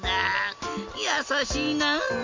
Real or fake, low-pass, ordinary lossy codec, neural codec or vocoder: real; 7.2 kHz; MP3, 48 kbps; none